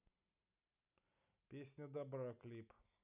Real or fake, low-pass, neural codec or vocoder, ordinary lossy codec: real; 3.6 kHz; none; none